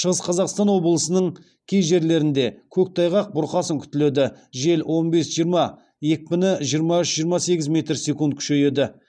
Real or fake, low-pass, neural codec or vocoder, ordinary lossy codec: real; 9.9 kHz; none; none